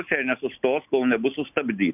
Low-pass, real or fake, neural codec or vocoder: 3.6 kHz; real; none